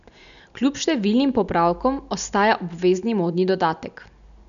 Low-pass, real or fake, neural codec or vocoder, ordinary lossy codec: 7.2 kHz; real; none; none